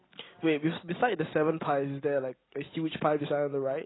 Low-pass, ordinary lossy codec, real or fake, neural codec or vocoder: 7.2 kHz; AAC, 16 kbps; real; none